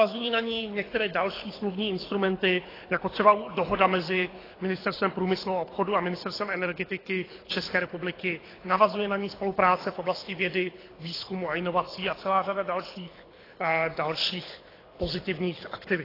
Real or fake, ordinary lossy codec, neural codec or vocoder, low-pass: fake; AAC, 24 kbps; codec, 24 kHz, 6 kbps, HILCodec; 5.4 kHz